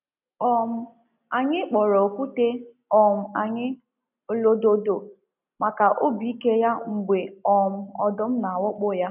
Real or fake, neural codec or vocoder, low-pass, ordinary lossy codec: real; none; 3.6 kHz; none